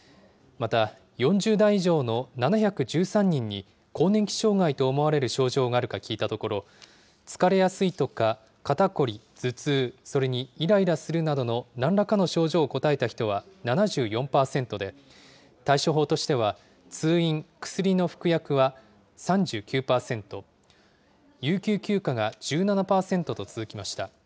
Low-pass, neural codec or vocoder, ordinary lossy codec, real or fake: none; none; none; real